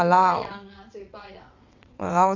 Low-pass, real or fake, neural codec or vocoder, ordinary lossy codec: 7.2 kHz; fake; vocoder, 22.05 kHz, 80 mel bands, WaveNeXt; Opus, 64 kbps